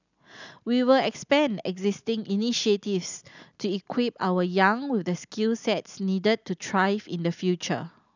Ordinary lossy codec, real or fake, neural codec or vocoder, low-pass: none; real; none; 7.2 kHz